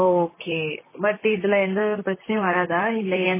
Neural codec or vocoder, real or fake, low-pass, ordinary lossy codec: vocoder, 44.1 kHz, 128 mel bands, Pupu-Vocoder; fake; 3.6 kHz; MP3, 16 kbps